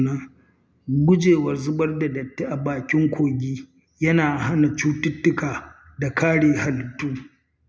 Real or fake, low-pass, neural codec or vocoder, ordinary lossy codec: real; none; none; none